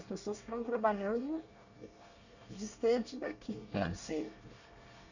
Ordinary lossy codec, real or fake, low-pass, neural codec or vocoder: none; fake; 7.2 kHz; codec, 24 kHz, 1 kbps, SNAC